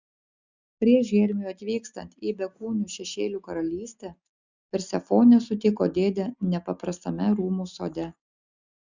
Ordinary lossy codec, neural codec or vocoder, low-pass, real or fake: Opus, 64 kbps; none; 7.2 kHz; real